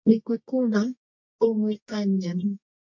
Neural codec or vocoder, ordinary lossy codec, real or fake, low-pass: codec, 24 kHz, 0.9 kbps, WavTokenizer, medium music audio release; MP3, 48 kbps; fake; 7.2 kHz